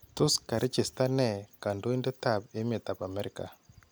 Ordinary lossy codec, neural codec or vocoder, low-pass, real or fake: none; none; none; real